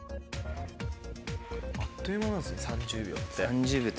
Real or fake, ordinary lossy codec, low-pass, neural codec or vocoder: real; none; none; none